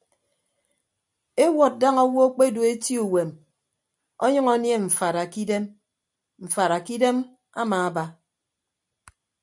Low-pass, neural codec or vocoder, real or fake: 10.8 kHz; none; real